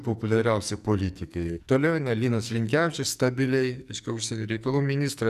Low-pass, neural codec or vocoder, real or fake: 14.4 kHz; codec, 44.1 kHz, 2.6 kbps, SNAC; fake